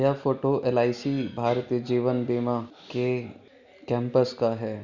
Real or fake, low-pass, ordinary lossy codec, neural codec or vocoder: real; 7.2 kHz; none; none